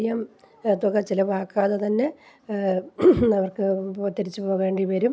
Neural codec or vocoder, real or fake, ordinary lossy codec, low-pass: none; real; none; none